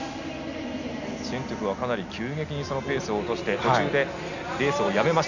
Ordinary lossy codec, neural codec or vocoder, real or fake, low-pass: none; none; real; 7.2 kHz